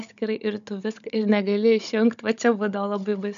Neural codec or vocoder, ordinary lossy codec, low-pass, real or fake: codec, 16 kHz, 16 kbps, FunCodec, trained on Chinese and English, 50 frames a second; AAC, 96 kbps; 7.2 kHz; fake